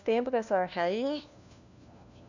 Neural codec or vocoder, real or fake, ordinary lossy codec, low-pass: codec, 16 kHz, 1 kbps, FunCodec, trained on LibriTTS, 50 frames a second; fake; none; 7.2 kHz